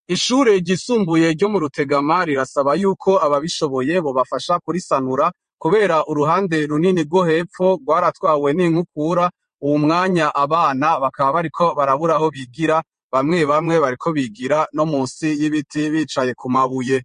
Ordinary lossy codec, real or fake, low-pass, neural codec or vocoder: MP3, 48 kbps; fake; 9.9 kHz; vocoder, 22.05 kHz, 80 mel bands, WaveNeXt